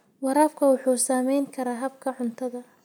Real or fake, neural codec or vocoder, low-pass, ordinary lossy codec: real; none; none; none